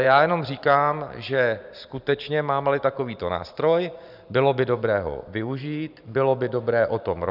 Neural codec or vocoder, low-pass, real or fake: vocoder, 44.1 kHz, 128 mel bands every 512 samples, BigVGAN v2; 5.4 kHz; fake